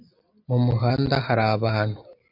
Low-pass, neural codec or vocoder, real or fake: 5.4 kHz; none; real